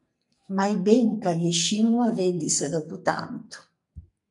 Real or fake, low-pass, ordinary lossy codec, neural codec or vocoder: fake; 10.8 kHz; MP3, 64 kbps; codec, 44.1 kHz, 2.6 kbps, SNAC